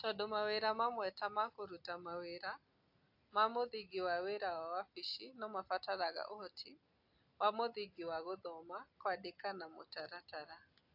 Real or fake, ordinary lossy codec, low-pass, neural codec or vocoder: real; AAC, 48 kbps; 5.4 kHz; none